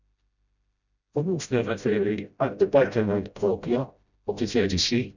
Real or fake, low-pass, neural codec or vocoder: fake; 7.2 kHz; codec, 16 kHz, 0.5 kbps, FreqCodec, smaller model